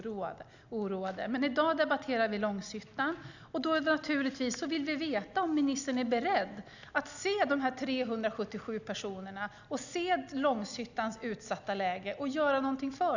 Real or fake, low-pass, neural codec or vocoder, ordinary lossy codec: real; 7.2 kHz; none; none